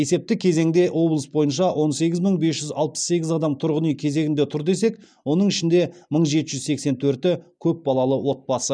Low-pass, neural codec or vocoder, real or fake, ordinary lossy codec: 9.9 kHz; none; real; none